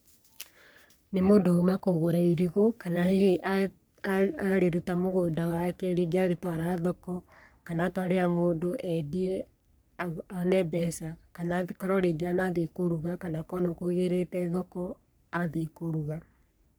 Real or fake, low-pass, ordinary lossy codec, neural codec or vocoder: fake; none; none; codec, 44.1 kHz, 3.4 kbps, Pupu-Codec